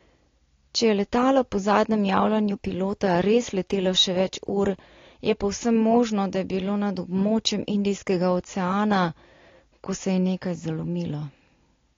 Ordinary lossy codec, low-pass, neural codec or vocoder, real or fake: AAC, 32 kbps; 7.2 kHz; none; real